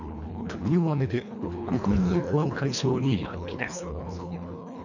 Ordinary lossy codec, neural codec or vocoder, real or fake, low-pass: none; codec, 24 kHz, 1.5 kbps, HILCodec; fake; 7.2 kHz